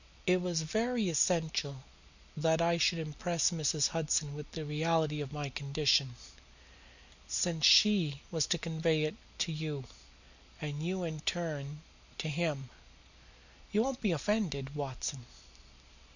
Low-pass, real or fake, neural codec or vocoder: 7.2 kHz; real; none